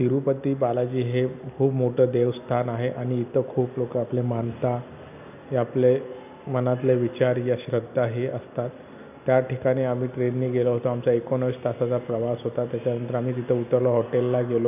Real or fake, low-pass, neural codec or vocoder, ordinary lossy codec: real; 3.6 kHz; none; none